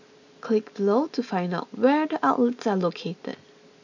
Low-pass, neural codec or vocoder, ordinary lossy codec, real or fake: 7.2 kHz; none; none; real